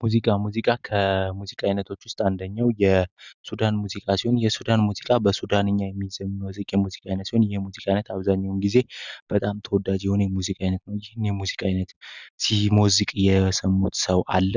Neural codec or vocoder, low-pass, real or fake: none; 7.2 kHz; real